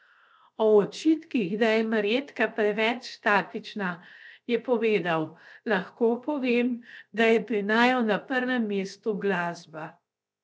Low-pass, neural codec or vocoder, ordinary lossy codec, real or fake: none; codec, 16 kHz, 0.7 kbps, FocalCodec; none; fake